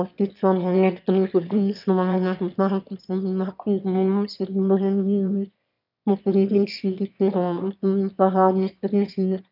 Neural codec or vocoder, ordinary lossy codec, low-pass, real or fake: autoencoder, 22.05 kHz, a latent of 192 numbers a frame, VITS, trained on one speaker; none; 5.4 kHz; fake